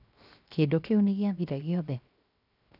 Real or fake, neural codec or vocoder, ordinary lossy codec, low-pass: fake; codec, 16 kHz, 0.7 kbps, FocalCodec; none; 5.4 kHz